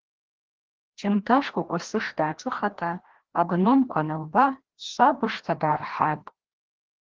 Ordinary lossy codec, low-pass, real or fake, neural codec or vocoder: Opus, 16 kbps; 7.2 kHz; fake; codec, 16 kHz, 1 kbps, FreqCodec, larger model